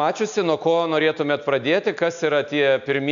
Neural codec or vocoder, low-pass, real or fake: none; 7.2 kHz; real